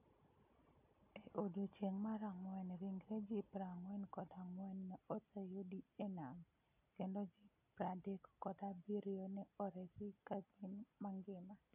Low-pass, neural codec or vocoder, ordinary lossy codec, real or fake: 3.6 kHz; none; none; real